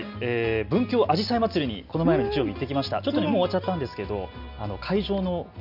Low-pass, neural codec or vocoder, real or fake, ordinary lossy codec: 5.4 kHz; none; real; none